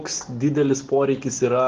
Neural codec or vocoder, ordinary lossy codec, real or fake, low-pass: none; Opus, 16 kbps; real; 7.2 kHz